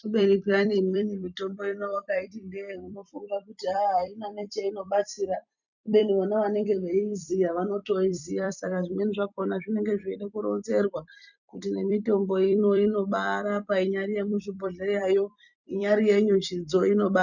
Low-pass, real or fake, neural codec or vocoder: 7.2 kHz; fake; vocoder, 44.1 kHz, 128 mel bands every 256 samples, BigVGAN v2